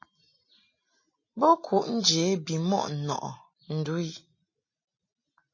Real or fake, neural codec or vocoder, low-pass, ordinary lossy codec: real; none; 7.2 kHz; MP3, 32 kbps